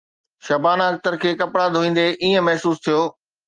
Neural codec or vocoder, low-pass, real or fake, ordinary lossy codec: none; 9.9 kHz; real; Opus, 24 kbps